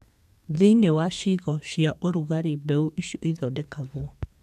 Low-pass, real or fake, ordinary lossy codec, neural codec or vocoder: 14.4 kHz; fake; none; codec, 32 kHz, 1.9 kbps, SNAC